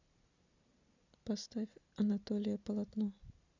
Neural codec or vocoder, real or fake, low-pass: none; real; 7.2 kHz